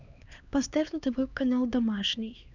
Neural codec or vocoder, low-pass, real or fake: codec, 16 kHz, 2 kbps, X-Codec, HuBERT features, trained on LibriSpeech; 7.2 kHz; fake